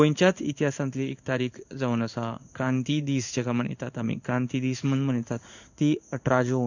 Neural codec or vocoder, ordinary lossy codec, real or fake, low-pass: codec, 16 kHz in and 24 kHz out, 1 kbps, XY-Tokenizer; none; fake; 7.2 kHz